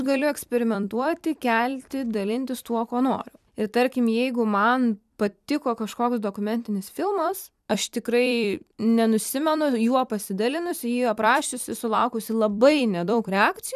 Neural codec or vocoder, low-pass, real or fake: vocoder, 44.1 kHz, 128 mel bands every 256 samples, BigVGAN v2; 14.4 kHz; fake